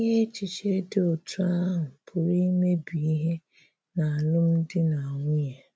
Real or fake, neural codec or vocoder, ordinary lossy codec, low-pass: real; none; none; none